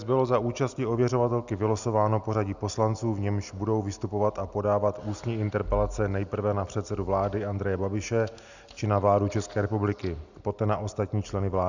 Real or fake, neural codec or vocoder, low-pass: real; none; 7.2 kHz